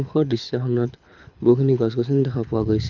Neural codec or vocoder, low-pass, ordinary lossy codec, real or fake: codec, 24 kHz, 6 kbps, HILCodec; 7.2 kHz; none; fake